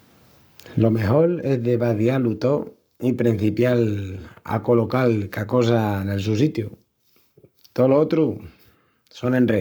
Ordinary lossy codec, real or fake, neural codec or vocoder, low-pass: none; fake; codec, 44.1 kHz, 7.8 kbps, Pupu-Codec; none